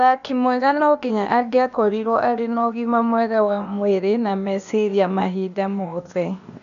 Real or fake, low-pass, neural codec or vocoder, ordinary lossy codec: fake; 7.2 kHz; codec, 16 kHz, 0.8 kbps, ZipCodec; none